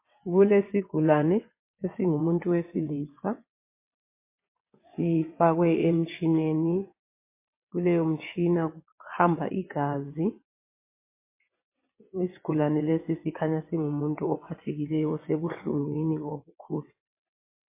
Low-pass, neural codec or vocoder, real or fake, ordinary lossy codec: 3.6 kHz; vocoder, 22.05 kHz, 80 mel bands, WaveNeXt; fake; MP3, 24 kbps